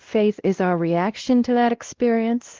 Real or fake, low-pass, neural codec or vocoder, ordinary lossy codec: fake; 7.2 kHz; codec, 16 kHz, 1 kbps, X-Codec, WavLM features, trained on Multilingual LibriSpeech; Opus, 16 kbps